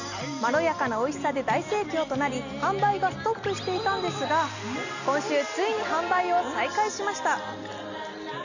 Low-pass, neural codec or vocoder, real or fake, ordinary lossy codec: 7.2 kHz; none; real; none